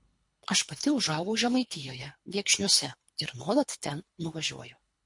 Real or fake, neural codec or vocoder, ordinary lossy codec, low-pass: fake; codec, 24 kHz, 3 kbps, HILCodec; MP3, 48 kbps; 10.8 kHz